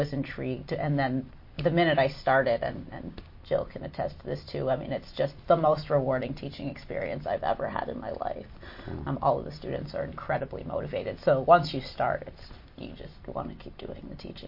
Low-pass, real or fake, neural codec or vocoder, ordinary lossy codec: 5.4 kHz; real; none; MP3, 32 kbps